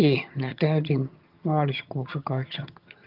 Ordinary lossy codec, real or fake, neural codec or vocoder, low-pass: Opus, 32 kbps; fake; vocoder, 22.05 kHz, 80 mel bands, HiFi-GAN; 5.4 kHz